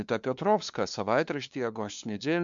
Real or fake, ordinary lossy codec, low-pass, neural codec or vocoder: fake; MP3, 64 kbps; 7.2 kHz; codec, 16 kHz, 2 kbps, FunCodec, trained on LibriTTS, 25 frames a second